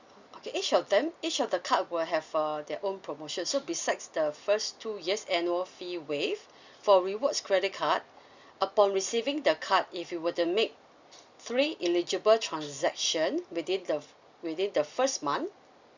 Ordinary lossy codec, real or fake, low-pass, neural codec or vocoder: Opus, 64 kbps; real; 7.2 kHz; none